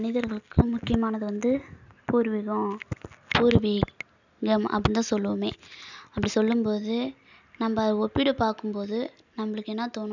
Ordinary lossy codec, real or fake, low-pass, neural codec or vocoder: none; real; 7.2 kHz; none